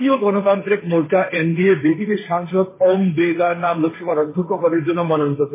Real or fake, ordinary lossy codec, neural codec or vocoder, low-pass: fake; MP3, 16 kbps; codec, 16 kHz, 1.1 kbps, Voila-Tokenizer; 3.6 kHz